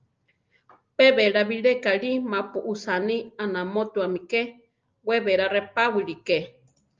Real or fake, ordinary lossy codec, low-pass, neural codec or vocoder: real; Opus, 24 kbps; 7.2 kHz; none